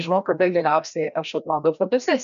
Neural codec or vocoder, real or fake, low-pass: codec, 16 kHz, 1 kbps, FreqCodec, larger model; fake; 7.2 kHz